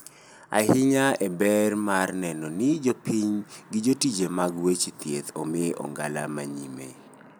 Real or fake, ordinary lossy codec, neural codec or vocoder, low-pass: real; none; none; none